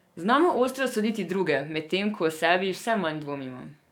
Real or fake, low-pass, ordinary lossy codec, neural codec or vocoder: fake; 19.8 kHz; none; codec, 44.1 kHz, 7.8 kbps, DAC